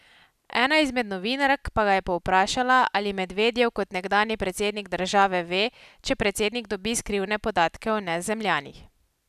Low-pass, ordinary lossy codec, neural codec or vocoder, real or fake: 14.4 kHz; none; none; real